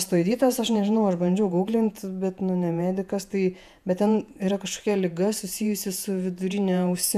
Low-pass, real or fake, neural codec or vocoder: 14.4 kHz; fake; vocoder, 48 kHz, 128 mel bands, Vocos